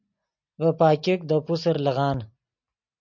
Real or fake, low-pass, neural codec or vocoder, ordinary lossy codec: real; 7.2 kHz; none; MP3, 48 kbps